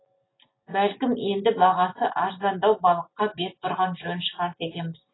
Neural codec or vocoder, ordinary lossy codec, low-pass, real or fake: none; AAC, 16 kbps; 7.2 kHz; real